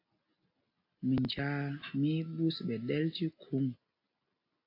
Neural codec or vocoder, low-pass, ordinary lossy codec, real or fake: none; 5.4 kHz; AAC, 32 kbps; real